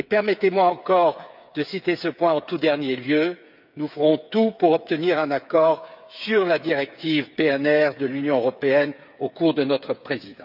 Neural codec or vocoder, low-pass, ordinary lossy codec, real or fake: codec, 16 kHz, 8 kbps, FreqCodec, smaller model; 5.4 kHz; none; fake